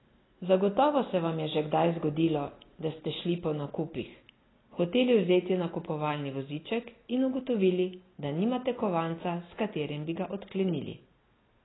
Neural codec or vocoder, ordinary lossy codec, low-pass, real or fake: none; AAC, 16 kbps; 7.2 kHz; real